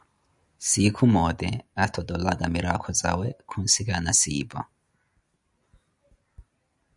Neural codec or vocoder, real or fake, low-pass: none; real; 10.8 kHz